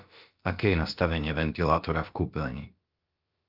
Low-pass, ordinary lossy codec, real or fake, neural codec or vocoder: 5.4 kHz; Opus, 24 kbps; fake; codec, 16 kHz, about 1 kbps, DyCAST, with the encoder's durations